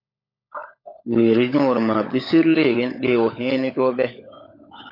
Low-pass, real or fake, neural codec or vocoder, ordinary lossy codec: 5.4 kHz; fake; codec, 16 kHz, 16 kbps, FunCodec, trained on LibriTTS, 50 frames a second; AAC, 32 kbps